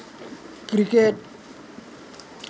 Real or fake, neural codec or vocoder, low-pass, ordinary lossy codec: real; none; none; none